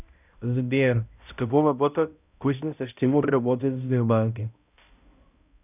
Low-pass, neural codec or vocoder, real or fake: 3.6 kHz; codec, 16 kHz, 0.5 kbps, X-Codec, HuBERT features, trained on balanced general audio; fake